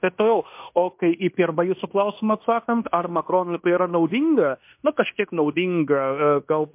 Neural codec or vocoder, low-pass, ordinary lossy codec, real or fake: codec, 16 kHz in and 24 kHz out, 0.9 kbps, LongCat-Audio-Codec, fine tuned four codebook decoder; 3.6 kHz; MP3, 32 kbps; fake